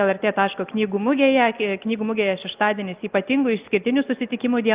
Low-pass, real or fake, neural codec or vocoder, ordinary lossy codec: 3.6 kHz; real; none; Opus, 24 kbps